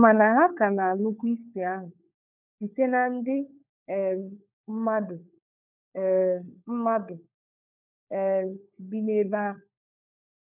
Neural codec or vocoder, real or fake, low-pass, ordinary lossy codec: codec, 16 kHz, 4 kbps, FunCodec, trained on LibriTTS, 50 frames a second; fake; 3.6 kHz; none